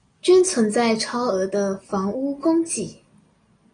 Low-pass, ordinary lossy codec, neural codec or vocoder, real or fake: 9.9 kHz; AAC, 32 kbps; none; real